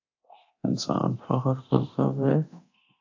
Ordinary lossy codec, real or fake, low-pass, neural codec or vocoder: AAC, 48 kbps; fake; 7.2 kHz; codec, 24 kHz, 0.9 kbps, DualCodec